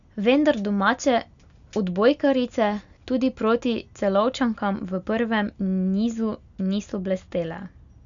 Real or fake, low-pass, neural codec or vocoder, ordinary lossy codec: real; 7.2 kHz; none; none